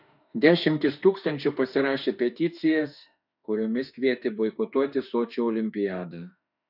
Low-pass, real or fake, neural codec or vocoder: 5.4 kHz; fake; autoencoder, 48 kHz, 32 numbers a frame, DAC-VAE, trained on Japanese speech